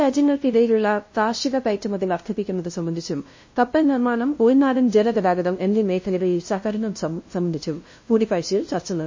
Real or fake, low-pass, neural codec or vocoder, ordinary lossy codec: fake; 7.2 kHz; codec, 16 kHz, 0.5 kbps, FunCodec, trained on LibriTTS, 25 frames a second; MP3, 32 kbps